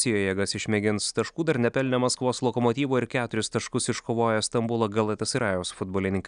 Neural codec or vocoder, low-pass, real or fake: none; 9.9 kHz; real